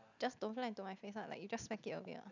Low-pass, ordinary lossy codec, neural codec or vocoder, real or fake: 7.2 kHz; none; none; real